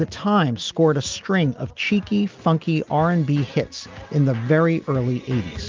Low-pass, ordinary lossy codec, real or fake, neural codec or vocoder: 7.2 kHz; Opus, 24 kbps; real; none